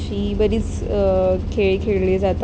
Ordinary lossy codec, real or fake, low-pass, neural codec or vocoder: none; real; none; none